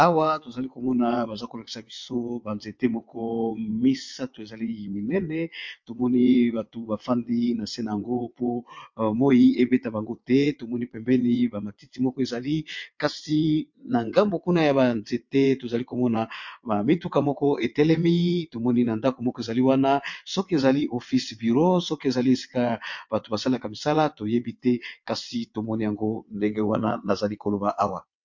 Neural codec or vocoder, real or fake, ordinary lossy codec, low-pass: vocoder, 22.05 kHz, 80 mel bands, WaveNeXt; fake; MP3, 48 kbps; 7.2 kHz